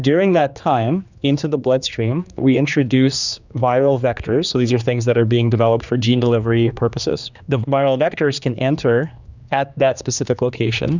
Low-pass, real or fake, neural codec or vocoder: 7.2 kHz; fake; codec, 16 kHz, 2 kbps, X-Codec, HuBERT features, trained on general audio